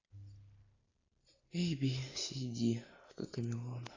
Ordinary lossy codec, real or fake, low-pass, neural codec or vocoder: AAC, 32 kbps; real; 7.2 kHz; none